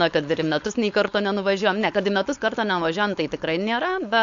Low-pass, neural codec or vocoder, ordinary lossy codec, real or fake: 7.2 kHz; codec, 16 kHz, 4.8 kbps, FACodec; AAC, 64 kbps; fake